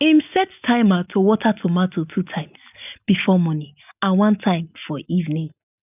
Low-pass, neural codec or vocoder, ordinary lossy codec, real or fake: 3.6 kHz; none; none; real